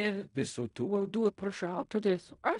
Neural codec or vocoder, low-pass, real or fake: codec, 16 kHz in and 24 kHz out, 0.4 kbps, LongCat-Audio-Codec, fine tuned four codebook decoder; 10.8 kHz; fake